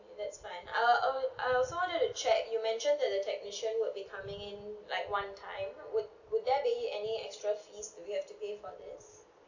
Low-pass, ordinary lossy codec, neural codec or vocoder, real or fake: 7.2 kHz; none; none; real